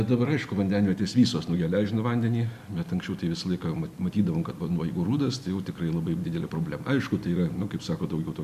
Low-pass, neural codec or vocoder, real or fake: 14.4 kHz; none; real